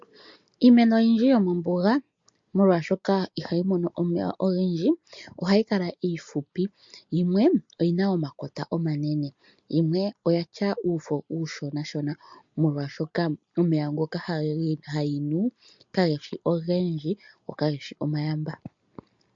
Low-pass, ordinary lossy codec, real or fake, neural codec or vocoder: 7.2 kHz; MP3, 48 kbps; real; none